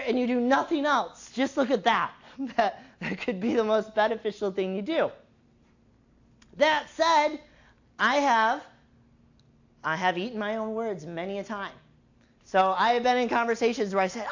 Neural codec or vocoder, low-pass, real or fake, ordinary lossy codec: none; 7.2 kHz; real; AAC, 48 kbps